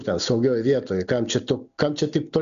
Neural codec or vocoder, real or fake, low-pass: none; real; 7.2 kHz